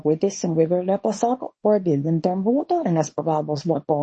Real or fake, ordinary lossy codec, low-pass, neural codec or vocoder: fake; MP3, 32 kbps; 10.8 kHz; codec, 24 kHz, 0.9 kbps, WavTokenizer, small release